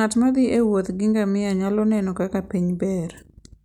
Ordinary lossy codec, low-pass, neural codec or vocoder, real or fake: none; 19.8 kHz; none; real